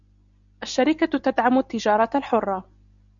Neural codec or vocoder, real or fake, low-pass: none; real; 7.2 kHz